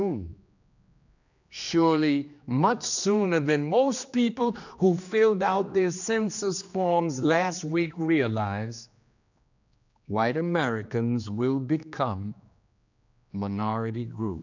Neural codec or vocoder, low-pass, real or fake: codec, 16 kHz, 2 kbps, X-Codec, HuBERT features, trained on general audio; 7.2 kHz; fake